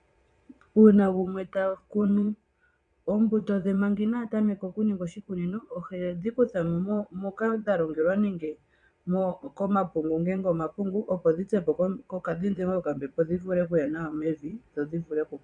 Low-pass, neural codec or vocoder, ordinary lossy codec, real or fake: 9.9 kHz; vocoder, 22.05 kHz, 80 mel bands, Vocos; MP3, 96 kbps; fake